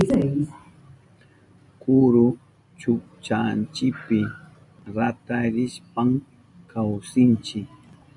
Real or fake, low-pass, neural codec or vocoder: real; 10.8 kHz; none